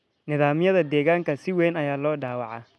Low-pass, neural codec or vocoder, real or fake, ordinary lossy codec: none; none; real; none